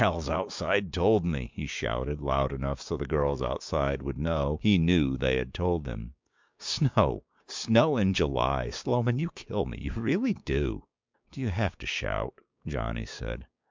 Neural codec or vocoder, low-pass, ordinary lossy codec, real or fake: codec, 16 kHz, 6 kbps, DAC; 7.2 kHz; MP3, 64 kbps; fake